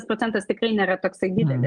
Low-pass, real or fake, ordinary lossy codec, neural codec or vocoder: 10.8 kHz; real; Opus, 32 kbps; none